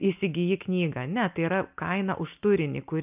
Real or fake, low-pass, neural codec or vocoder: real; 3.6 kHz; none